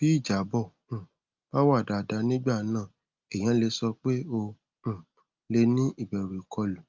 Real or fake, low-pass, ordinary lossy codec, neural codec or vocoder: real; 7.2 kHz; Opus, 24 kbps; none